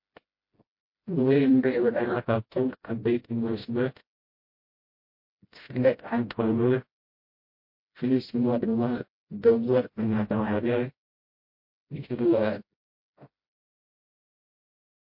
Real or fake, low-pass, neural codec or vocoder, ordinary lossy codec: fake; 5.4 kHz; codec, 16 kHz, 0.5 kbps, FreqCodec, smaller model; MP3, 32 kbps